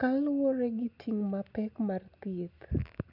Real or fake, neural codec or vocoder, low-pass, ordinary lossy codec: real; none; 5.4 kHz; none